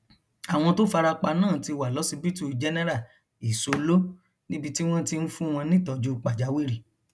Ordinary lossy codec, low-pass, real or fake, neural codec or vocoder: none; none; real; none